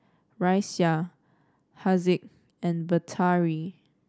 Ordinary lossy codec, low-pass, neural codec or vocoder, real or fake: none; none; none; real